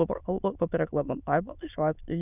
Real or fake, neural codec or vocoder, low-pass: fake; autoencoder, 22.05 kHz, a latent of 192 numbers a frame, VITS, trained on many speakers; 3.6 kHz